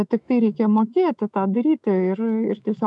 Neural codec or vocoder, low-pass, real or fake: autoencoder, 48 kHz, 128 numbers a frame, DAC-VAE, trained on Japanese speech; 10.8 kHz; fake